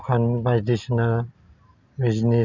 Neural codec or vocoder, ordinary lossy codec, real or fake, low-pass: none; none; real; 7.2 kHz